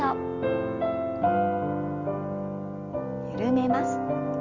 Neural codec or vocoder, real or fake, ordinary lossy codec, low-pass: none; real; Opus, 32 kbps; 7.2 kHz